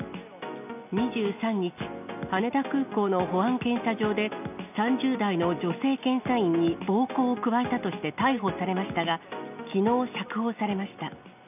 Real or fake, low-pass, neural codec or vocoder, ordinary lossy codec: real; 3.6 kHz; none; none